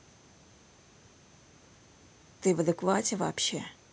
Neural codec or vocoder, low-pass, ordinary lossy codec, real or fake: none; none; none; real